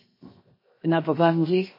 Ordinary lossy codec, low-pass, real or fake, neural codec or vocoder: MP3, 24 kbps; 5.4 kHz; fake; codec, 16 kHz, 0.7 kbps, FocalCodec